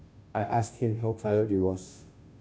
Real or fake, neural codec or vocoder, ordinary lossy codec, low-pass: fake; codec, 16 kHz, 0.5 kbps, FunCodec, trained on Chinese and English, 25 frames a second; none; none